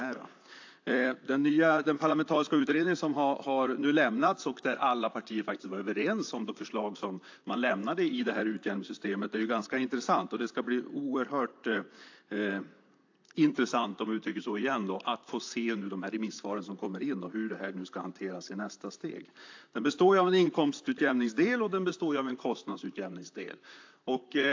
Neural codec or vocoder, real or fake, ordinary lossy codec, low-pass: vocoder, 44.1 kHz, 128 mel bands, Pupu-Vocoder; fake; AAC, 48 kbps; 7.2 kHz